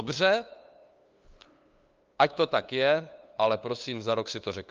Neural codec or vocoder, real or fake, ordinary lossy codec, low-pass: codec, 16 kHz, 2 kbps, FunCodec, trained on LibriTTS, 25 frames a second; fake; Opus, 24 kbps; 7.2 kHz